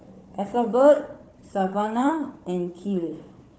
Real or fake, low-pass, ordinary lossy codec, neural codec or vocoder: fake; none; none; codec, 16 kHz, 4 kbps, FunCodec, trained on Chinese and English, 50 frames a second